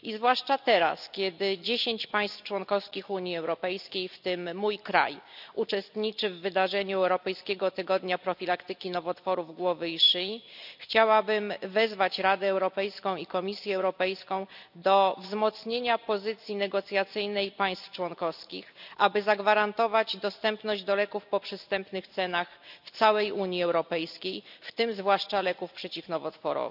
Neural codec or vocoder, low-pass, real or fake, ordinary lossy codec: none; 5.4 kHz; real; none